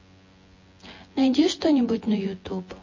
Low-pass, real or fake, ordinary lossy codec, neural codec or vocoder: 7.2 kHz; fake; MP3, 32 kbps; vocoder, 24 kHz, 100 mel bands, Vocos